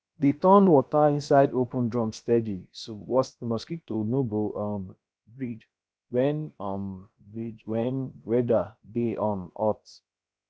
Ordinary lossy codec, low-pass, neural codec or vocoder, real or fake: none; none; codec, 16 kHz, about 1 kbps, DyCAST, with the encoder's durations; fake